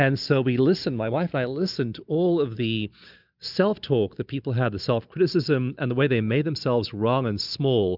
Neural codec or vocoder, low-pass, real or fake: none; 5.4 kHz; real